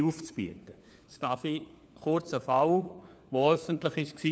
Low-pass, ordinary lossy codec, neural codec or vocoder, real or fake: none; none; codec, 16 kHz, 4 kbps, FunCodec, trained on LibriTTS, 50 frames a second; fake